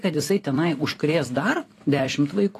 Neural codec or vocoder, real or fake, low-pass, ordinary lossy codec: vocoder, 44.1 kHz, 128 mel bands every 256 samples, BigVGAN v2; fake; 14.4 kHz; AAC, 48 kbps